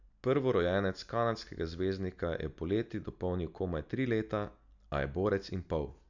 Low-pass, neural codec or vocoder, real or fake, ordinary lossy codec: 7.2 kHz; none; real; none